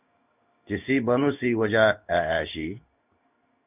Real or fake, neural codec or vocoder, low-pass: fake; codec, 16 kHz in and 24 kHz out, 1 kbps, XY-Tokenizer; 3.6 kHz